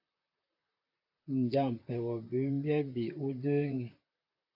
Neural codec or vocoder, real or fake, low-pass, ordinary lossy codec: vocoder, 44.1 kHz, 128 mel bands, Pupu-Vocoder; fake; 5.4 kHz; AAC, 32 kbps